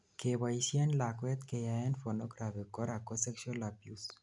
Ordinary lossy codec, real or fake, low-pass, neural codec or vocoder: none; real; 10.8 kHz; none